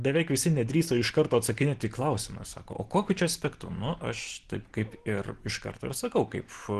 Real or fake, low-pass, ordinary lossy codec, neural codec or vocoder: real; 10.8 kHz; Opus, 16 kbps; none